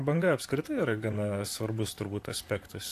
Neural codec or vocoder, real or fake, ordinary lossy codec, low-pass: vocoder, 44.1 kHz, 128 mel bands, Pupu-Vocoder; fake; AAC, 64 kbps; 14.4 kHz